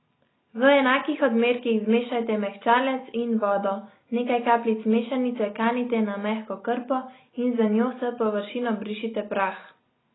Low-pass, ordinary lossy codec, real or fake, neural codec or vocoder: 7.2 kHz; AAC, 16 kbps; real; none